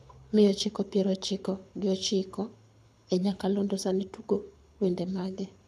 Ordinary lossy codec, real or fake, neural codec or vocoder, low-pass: none; fake; codec, 24 kHz, 6 kbps, HILCodec; none